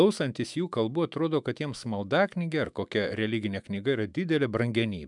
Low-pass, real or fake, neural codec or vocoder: 10.8 kHz; fake; autoencoder, 48 kHz, 128 numbers a frame, DAC-VAE, trained on Japanese speech